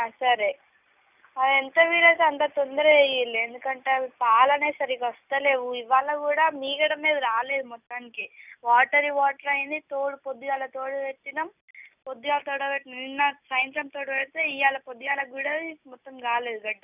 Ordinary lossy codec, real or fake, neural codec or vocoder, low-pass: none; real; none; 3.6 kHz